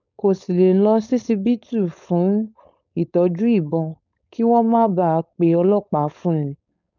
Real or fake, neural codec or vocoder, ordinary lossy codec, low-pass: fake; codec, 16 kHz, 4.8 kbps, FACodec; none; 7.2 kHz